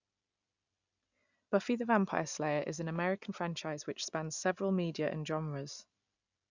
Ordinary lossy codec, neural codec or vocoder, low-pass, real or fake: none; none; 7.2 kHz; real